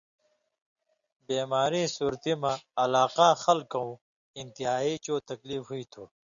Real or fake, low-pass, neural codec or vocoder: real; 7.2 kHz; none